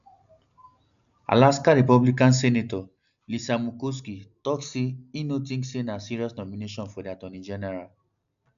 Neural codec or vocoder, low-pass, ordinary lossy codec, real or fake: none; 7.2 kHz; none; real